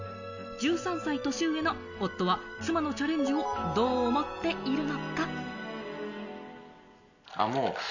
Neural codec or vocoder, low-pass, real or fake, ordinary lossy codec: none; 7.2 kHz; real; none